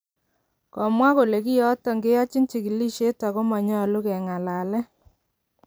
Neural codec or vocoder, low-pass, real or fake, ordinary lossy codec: vocoder, 44.1 kHz, 128 mel bands every 256 samples, BigVGAN v2; none; fake; none